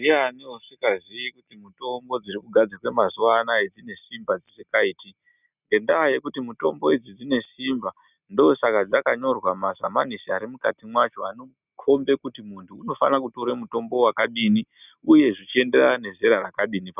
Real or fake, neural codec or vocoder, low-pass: real; none; 3.6 kHz